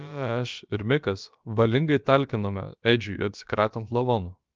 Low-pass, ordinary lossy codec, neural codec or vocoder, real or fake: 7.2 kHz; Opus, 24 kbps; codec, 16 kHz, about 1 kbps, DyCAST, with the encoder's durations; fake